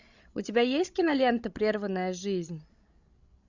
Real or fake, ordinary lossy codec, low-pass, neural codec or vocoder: fake; Opus, 64 kbps; 7.2 kHz; codec, 16 kHz, 16 kbps, FreqCodec, larger model